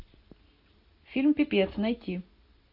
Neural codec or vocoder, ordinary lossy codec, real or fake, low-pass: none; AAC, 48 kbps; real; 5.4 kHz